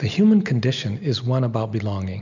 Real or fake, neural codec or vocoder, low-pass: real; none; 7.2 kHz